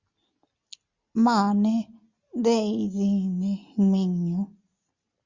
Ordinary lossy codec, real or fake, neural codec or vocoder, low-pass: Opus, 64 kbps; real; none; 7.2 kHz